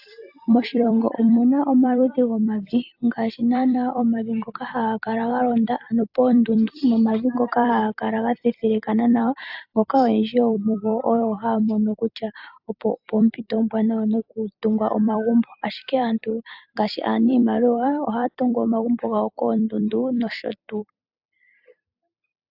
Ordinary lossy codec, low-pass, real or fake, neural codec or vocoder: AAC, 48 kbps; 5.4 kHz; fake; vocoder, 44.1 kHz, 128 mel bands every 256 samples, BigVGAN v2